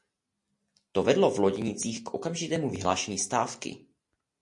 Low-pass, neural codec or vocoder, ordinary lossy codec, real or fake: 10.8 kHz; none; MP3, 48 kbps; real